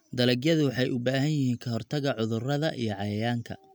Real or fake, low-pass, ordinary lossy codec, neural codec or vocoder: real; none; none; none